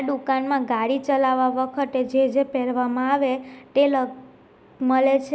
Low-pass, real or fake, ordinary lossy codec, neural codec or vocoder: none; real; none; none